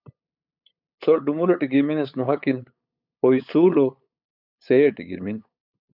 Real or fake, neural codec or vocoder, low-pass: fake; codec, 16 kHz, 8 kbps, FunCodec, trained on LibriTTS, 25 frames a second; 5.4 kHz